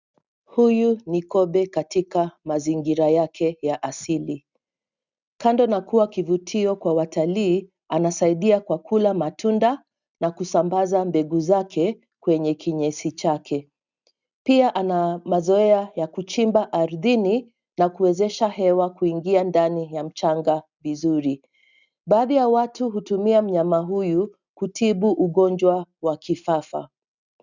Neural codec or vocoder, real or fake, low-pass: none; real; 7.2 kHz